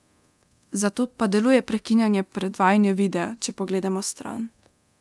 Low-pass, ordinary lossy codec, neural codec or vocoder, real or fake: none; none; codec, 24 kHz, 0.9 kbps, DualCodec; fake